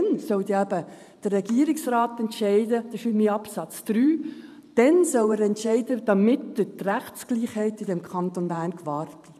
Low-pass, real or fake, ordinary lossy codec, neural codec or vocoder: 14.4 kHz; fake; none; vocoder, 44.1 kHz, 128 mel bands every 512 samples, BigVGAN v2